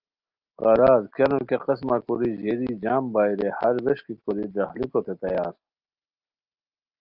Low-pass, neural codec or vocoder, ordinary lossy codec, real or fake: 5.4 kHz; none; Opus, 32 kbps; real